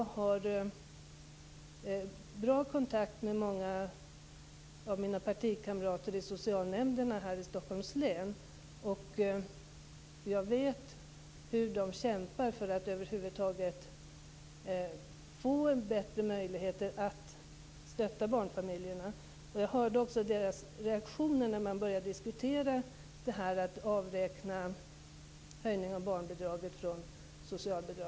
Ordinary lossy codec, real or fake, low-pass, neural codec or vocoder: none; real; none; none